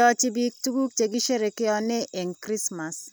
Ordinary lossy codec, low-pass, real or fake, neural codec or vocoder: none; none; real; none